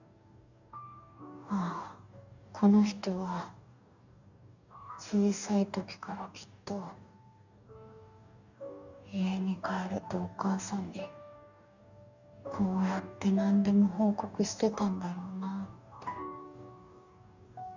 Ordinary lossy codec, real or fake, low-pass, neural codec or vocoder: none; fake; 7.2 kHz; codec, 44.1 kHz, 2.6 kbps, DAC